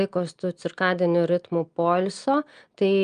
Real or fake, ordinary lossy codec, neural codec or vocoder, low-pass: real; Opus, 32 kbps; none; 9.9 kHz